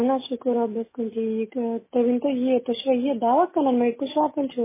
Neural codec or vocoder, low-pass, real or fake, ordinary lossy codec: none; 3.6 kHz; real; MP3, 16 kbps